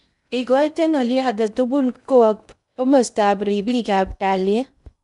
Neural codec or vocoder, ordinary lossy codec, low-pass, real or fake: codec, 16 kHz in and 24 kHz out, 0.6 kbps, FocalCodec, streaming, 2048 codes; none; 10.8 kHz; fake